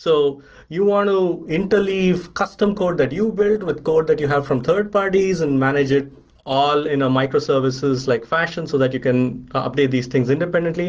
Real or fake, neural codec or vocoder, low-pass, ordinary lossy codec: real; none; 7.2 kHz; Opus, 16 kbps